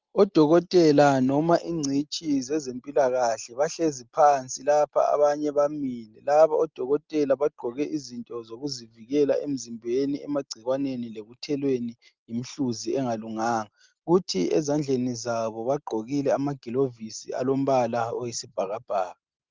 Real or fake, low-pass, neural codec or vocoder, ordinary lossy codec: real; 7.2 kHz; none; Opus, 32 kbps